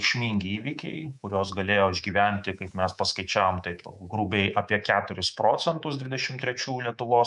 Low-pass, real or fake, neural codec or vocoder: 10.8 kHz; fake; codec, 24 kHz, 3.1 kbps, DualCodec